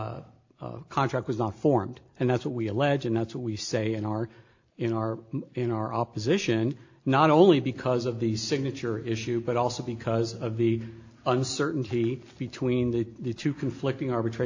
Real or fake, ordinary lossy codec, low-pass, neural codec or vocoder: real; AAC, 48 kbps; 7.2 kHz; none